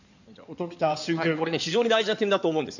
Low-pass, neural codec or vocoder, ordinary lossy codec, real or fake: 7.2 kHz; codec, 16 kHz in and 24 kHz out, 2.2 kbps, FireRedTTS-2 codec; none; fake